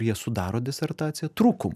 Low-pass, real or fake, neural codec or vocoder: 14.4 kHz; real; none